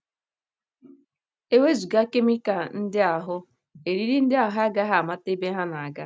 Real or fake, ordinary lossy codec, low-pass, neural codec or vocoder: real; none; none; none